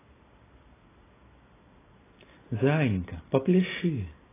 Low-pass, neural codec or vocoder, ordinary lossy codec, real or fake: 3.6 kHz; none; AAC, 16 kbps; real